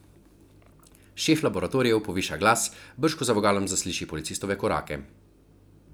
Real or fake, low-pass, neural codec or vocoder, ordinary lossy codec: real; none; none; none